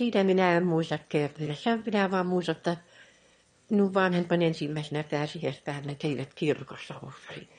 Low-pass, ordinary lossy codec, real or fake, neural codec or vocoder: 9.9 kHz; MP3, 48 kbps; fake; autoencoder, 22.05 kHz, a latent of 192 numbers a frame, VITS, trained on one speaker